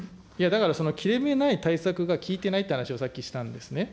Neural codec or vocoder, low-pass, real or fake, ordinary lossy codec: none; none; real; none